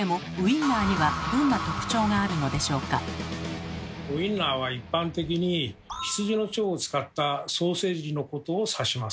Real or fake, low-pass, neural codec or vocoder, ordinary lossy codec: real; none; none; none